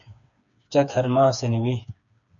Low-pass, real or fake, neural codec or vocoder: 7.2 kHz; fake; codec, 16 kHz, 4 kbps, FreqCodec, smaller model